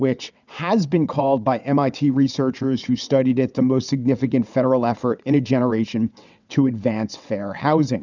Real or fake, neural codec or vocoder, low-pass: fake; vocoder, 22.05 kHz, 80 mel bands, WaveNeXt; 7.2 kHz